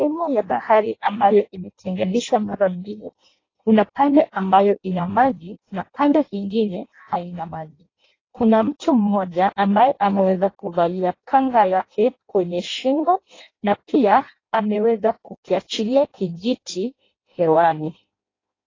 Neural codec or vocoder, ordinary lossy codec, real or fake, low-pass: codec, 16 kHz in and 24 kHz out, 0.6 kbps, FireRedTTS-2 codec; AAC, 32 kbps; fake; 7.2 kHz